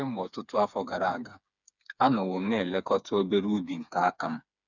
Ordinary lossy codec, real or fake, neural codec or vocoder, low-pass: none; fake; codec, 16 kHz, 4 kbps, FreqCodec, smaller model; 7.2 kHz